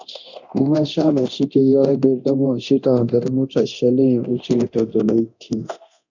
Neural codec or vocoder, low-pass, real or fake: codec, 24 kHz, 0.9 kbps, DualCodec; 7.2 kHz; fake